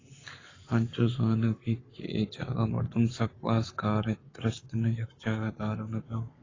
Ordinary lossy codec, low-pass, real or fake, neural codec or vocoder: AAC, 32 kbps; 7.2 kHz; fake; codec, 44.1 kHz, 7.8 kbps, Pupu-Codec